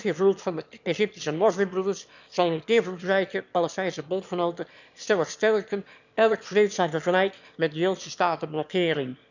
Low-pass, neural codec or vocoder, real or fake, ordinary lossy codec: 7.2 kHz; autoencoder, 22.05 kHz, a latent of 192 numbers a frame, VITS, trained on one speaker; fake; none